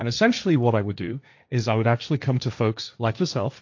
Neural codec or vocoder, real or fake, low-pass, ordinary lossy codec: codec, 16 kHz, 1.1 kbps, Voila-Tokenizer; fake; 7.2 kHz; AAC, 48 kbps